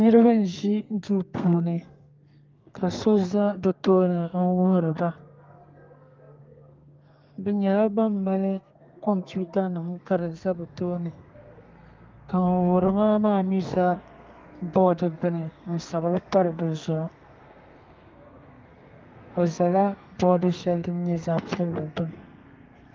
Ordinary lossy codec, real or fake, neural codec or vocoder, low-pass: Opus, 32 kbps; fake; codec, 32 kHz, 1.9 kbps, SNAC; 7.2 kHz